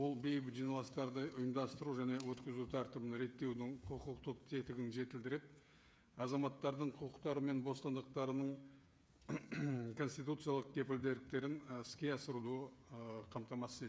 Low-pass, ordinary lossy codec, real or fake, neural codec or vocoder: none; none; fake; codec, 16 kHz, 8 kbps, FreqCodec, smaller model